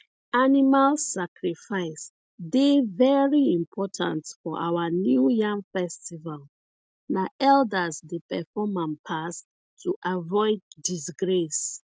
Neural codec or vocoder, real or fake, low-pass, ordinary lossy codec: none; real; none; none